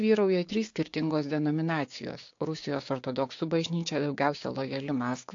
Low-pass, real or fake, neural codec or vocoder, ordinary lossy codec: 7.2 kHz; fake; codec, 16 kHz, 6 kbps, DAC; AAC, 48 kbps